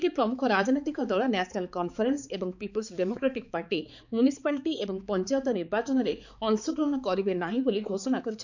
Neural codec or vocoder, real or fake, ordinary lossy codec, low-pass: codec, 16 kHz, 4 kbps, X-Codec, HuBERT features, trained on balanced general audio; fake; none; 7.2 kHz